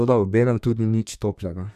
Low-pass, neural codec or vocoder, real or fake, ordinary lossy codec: 14.4 kHz; codec, 32 kHz, 1.9 kbps, SNAC; fake; none